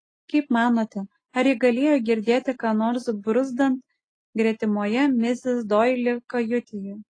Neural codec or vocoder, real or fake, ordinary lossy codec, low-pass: none; real; AAC, 32 kbps; 9.9 kHz